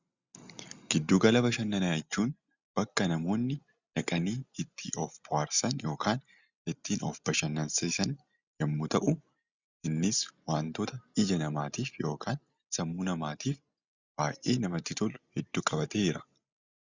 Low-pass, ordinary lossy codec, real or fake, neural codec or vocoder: 7.2 kHz; Opus, 64 kbps; real; none